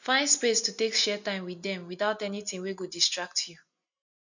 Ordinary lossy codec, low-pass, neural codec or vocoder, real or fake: none; 7.2 kHz; none; real